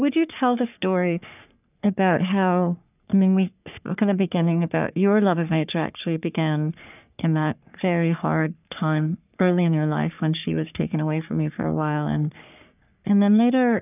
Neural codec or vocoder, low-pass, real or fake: codec, 44.1 kHz, 3.4 kbps, Pupu-Codec; 3.6 kHz; fake